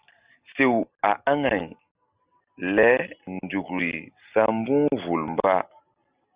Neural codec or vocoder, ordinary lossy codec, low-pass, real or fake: none; Opus, 64 kbps; 3.6 kHz; real